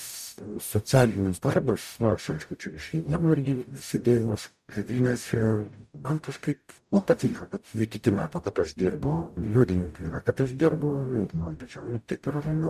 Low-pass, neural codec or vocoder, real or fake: 14.4 kHz; codec, 44.1 kHz, 0.9 kbps, DAC; fake